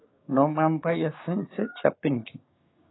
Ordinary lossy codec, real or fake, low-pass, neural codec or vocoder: AAC, 16 kbps; fake; 7.2 kHz; codec, 16 kHz, 6 kbps, DAC